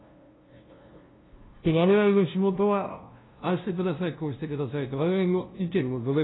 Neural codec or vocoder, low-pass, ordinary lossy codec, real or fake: codec, 16 kHz, 0.5 kbps, FunCodec, trained on LibriTTS, 25 frames a second; 7.2 kHz; AAC, 16 kbps; fake